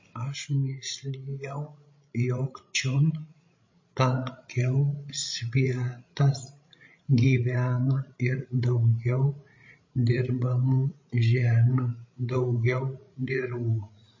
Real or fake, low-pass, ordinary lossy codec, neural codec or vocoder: fake; 7.2 kHz; MP3, 32 kbps; codec, 16 kHz, 16 kbps, FreqCodec, larger model